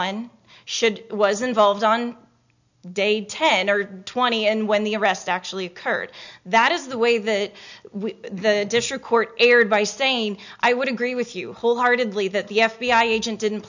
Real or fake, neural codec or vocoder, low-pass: real; none; 7.2 kHz